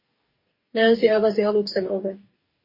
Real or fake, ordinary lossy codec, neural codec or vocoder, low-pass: fake; MP3, 24 kbps; codec, 44.1 kHz, 2.6 kbps, DAC; 5.4 kHz